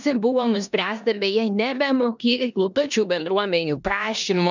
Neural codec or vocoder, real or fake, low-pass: codec, 16 kHz in and 24 kHz out, 0.9 kbps, LongCat-Audio-Codec, four codebook decoder; fake; 7.2 kHz